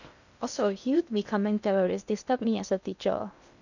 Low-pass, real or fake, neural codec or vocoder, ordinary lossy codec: 7.2 kHz; fake; codec, 16 kHz in and 24 kHz out, 0.6 kbps, FocalCodec, streaming, 2048 codes; none